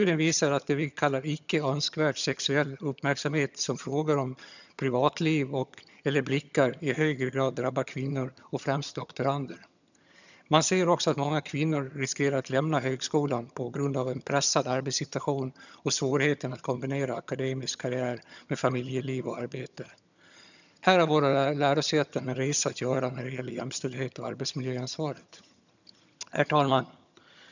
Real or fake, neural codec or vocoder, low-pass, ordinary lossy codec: fake; vocoder, 22.05 kHz, 80 mel bands, HiFi-GAN; 7.2 kHz; none